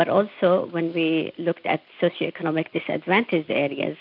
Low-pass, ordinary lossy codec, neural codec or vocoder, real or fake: 5.4 kHz; AAC, 48 kbps; none; real